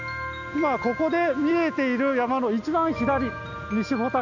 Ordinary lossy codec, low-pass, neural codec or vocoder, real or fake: none; 7.2 kHz; none; real